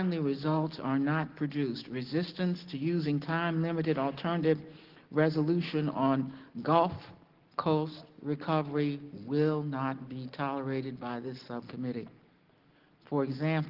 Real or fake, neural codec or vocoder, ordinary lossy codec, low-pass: real; none; Opus, 16 kbps; 5.4 kHz